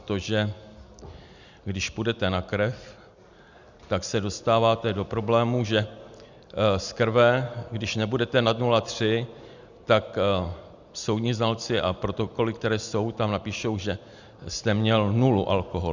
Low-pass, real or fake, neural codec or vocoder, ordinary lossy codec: 7.2 kHz; real; none; Opus, 64 kbps